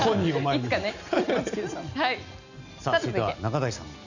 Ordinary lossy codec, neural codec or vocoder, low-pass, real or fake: none; none; 7.2 kHz; real